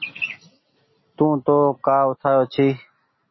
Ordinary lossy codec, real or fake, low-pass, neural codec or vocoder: MP3, 24 kbps; real; 7.2 kHz; none